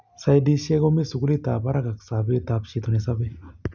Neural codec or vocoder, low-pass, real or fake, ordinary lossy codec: none; 7.2 kHz; real; none